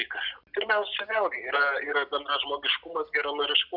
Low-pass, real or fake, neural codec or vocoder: 5.4 kHz; real; none